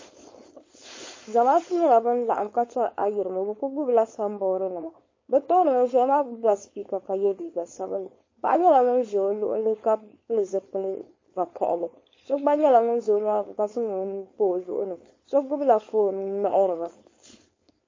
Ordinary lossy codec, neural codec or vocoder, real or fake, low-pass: MP3, 32 kbps; codec, 16 kHz, 4.8 kbps, FACodec; fake; 7.2 kHz